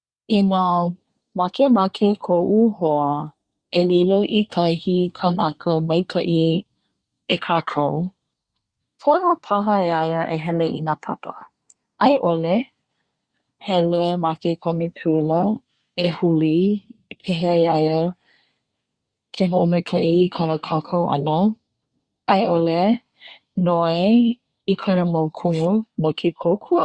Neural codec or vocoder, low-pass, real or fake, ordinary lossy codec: codec, 24 kHz, 1 kbps, SNAC; 9.9 kHz; fake; Opus, 64 kbps